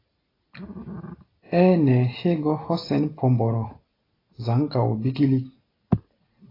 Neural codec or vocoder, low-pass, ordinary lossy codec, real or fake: none; 5.4 kHz; AAC, 24 kbps; real